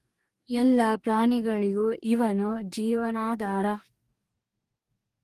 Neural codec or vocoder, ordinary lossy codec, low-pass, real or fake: codec, 44.1 kHz, 2.6 kbps, DAC; Opus, 32 kbps; 14.4 kHz; fake